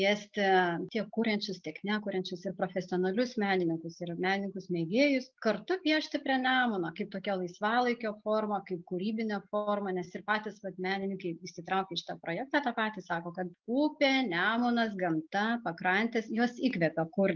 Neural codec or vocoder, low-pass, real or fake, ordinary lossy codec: none; 7.2 kHz; real; Opus, 32 kbps